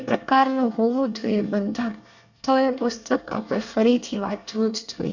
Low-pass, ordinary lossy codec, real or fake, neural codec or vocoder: 7.2 kHz; none; fake; codec, 24 kHz, 1 kbps, SNAC